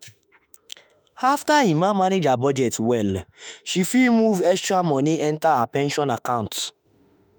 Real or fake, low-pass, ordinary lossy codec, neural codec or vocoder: fake; none; none; autoencoder, 48 kHz, 32 numbers a frame, DAC-VAE, trained on Japanese speech